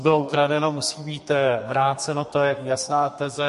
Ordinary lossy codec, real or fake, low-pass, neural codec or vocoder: MP3, 48 kbps; fake; 14.4 kHz; codec, 32 kHz, 1.9 kbps, SNAC